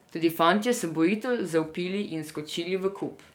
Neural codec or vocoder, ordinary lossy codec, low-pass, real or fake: codec, 44.1 kHz, 7.8 kbps, DAC; MP3, 96 kbps; 19.8 kHz; fake